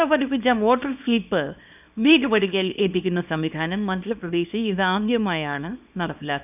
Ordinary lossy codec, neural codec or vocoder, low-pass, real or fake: none; codec, 24 kHz, 0.9 kbps, WavTokenizer, small release; 3.6 kHz; fake